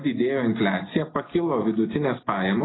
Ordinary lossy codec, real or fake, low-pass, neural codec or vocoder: AAC, 16 kbps; real; 7.2 kHz; none